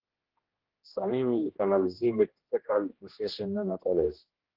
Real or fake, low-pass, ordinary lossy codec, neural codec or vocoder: fake; 5.4 kHz; Opus, 16 kbps; codec, 16 kHz, 1 kbps, X-Codec, HuBERT features, trained on general audio